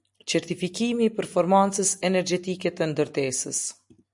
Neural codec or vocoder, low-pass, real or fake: none; 10.8 kHz; real